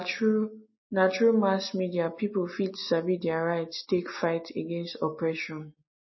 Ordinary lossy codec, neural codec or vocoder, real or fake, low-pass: MP3, 24 kbps; none; real; 7.2 kHz